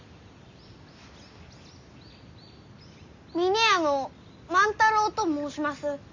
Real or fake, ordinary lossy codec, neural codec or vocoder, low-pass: real; MP3, 48 kbps; none; 7.2 kHz